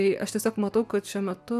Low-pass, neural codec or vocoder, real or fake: 14.4 kHz; none; real